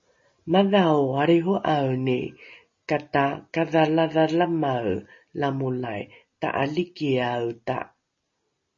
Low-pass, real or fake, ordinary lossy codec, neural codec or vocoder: 7.2 kHz; real; MP3, 32 kbps; none